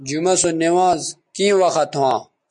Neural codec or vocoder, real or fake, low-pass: none; real; 9.9 kHz